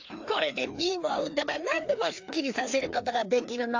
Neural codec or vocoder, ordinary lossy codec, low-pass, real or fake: codec, 16 kHz, 2 kbps, FreqCodec, larger model; AAC, 48 kbps; 7.2 kHz; fake